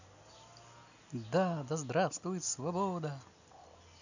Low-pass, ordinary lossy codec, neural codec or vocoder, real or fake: 7.2 kHz; none; none; real